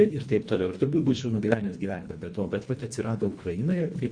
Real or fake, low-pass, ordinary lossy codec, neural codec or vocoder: fake; 9.9 kHz; Opus, 64 kbps; codec, 24 kHz, 1.5 kbps, HILCodec